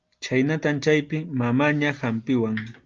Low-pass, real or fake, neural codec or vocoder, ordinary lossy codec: 7.2 kHz; real; none; Opus, 24 kbps